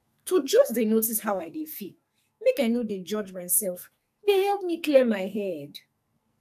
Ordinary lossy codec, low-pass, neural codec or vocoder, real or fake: AAC, 96 kbps; 14.4 kHz; codec, 44.1 kHz, 2.6 kbps, SNAC; fake